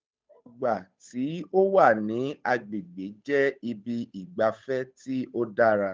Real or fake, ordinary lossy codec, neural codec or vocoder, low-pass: fake; none; codec, 16 kHz, 8 kbps, FunCodec, trained on Chinese and English, 25 frames a second; none